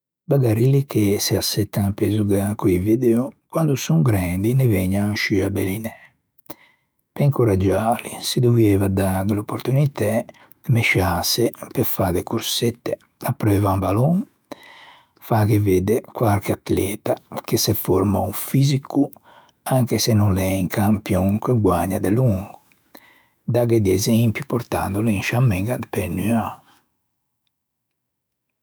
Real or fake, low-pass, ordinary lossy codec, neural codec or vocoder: fake; none; none; autoencoder, 48 kHz, 128 numbers a frame, DAC-VAE, trained on Japanese speech